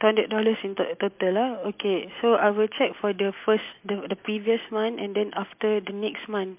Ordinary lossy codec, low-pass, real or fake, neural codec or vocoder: MP3, 32 kbps; 3.6 kHz; real; none